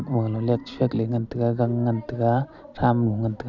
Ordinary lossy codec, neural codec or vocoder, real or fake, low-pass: none; none; real; 7.2 kHz